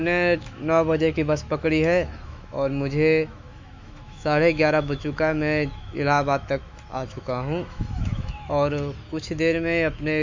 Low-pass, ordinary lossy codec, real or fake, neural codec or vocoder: 7.2 kHz; MP3, 64 kbps; fake; autoencoder, 48 kHz, 128 numbers a frame, DAC-VAE, trained on Japanese speech